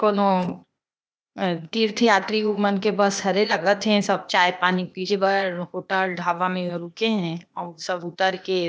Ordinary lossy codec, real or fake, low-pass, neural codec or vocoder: none; fake; none; codec, 16 kHz, 0.8 kbps, ZipCodec